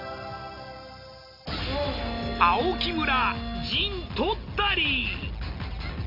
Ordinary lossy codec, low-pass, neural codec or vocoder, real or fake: MP3, 32 kbps; 5.4 kHz; none; real